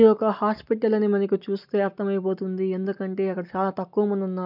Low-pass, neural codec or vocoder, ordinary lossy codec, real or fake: 5.4 kHz; none; none; real